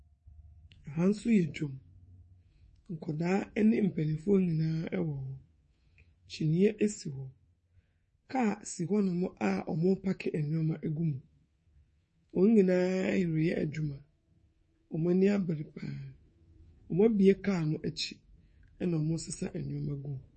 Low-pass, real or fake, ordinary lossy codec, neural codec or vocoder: 10.8 kHz; fake; MP3, 32 kbps; codec, 24 kHz, 3.1 kbps, DualCodec